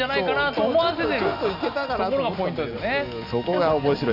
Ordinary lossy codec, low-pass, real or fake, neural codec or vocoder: none; 5.4 kHz; real; none